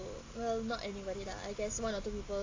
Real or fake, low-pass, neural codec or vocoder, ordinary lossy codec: real; 7.2 kHz; none; AAC, 32 kbps